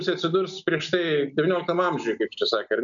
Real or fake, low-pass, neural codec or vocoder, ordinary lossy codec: real; 7.2 kHz; none; MP3, 96 kbps